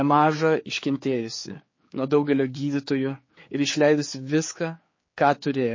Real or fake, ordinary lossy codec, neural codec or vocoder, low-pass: fake; MP3, 32 kbps; codec, 16 kHz, 4 kbps, X-Codec, HuBERT features, trained on general audio; 7.2 kHz